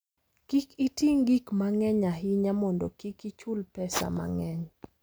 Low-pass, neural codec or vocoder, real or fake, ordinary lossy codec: none; none; real; none